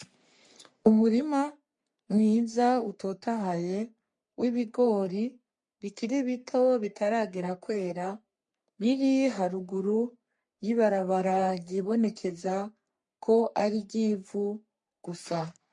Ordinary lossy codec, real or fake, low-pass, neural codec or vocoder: MP3, 48 kbps; fake; 10.8 kHz; codec, 44.1 kHz, 3.4 kbps, Pupu-Codec